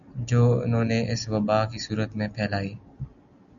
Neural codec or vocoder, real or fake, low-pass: none; real; 7.2 kHz